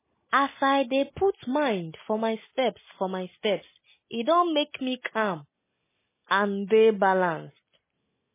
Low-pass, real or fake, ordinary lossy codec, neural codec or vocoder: 3.6 kHz; real; MP3, 16 kbps; none